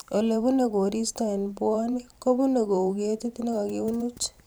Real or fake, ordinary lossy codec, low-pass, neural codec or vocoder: fake; none; none; vocoder, 44.1 kHz, 128 mel bands every 512 samples, BigVGAN v2